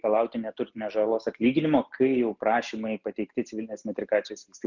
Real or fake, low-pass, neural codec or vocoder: real; 7.2 kHz; none